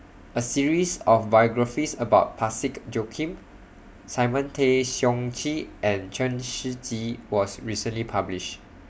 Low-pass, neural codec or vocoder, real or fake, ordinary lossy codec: none; none; real; none